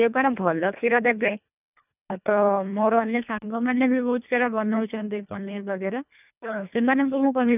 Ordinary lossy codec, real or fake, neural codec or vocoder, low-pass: none; fake; codec, 24 kHz, 1.5 kbps, HILCodec; 3.6 kHz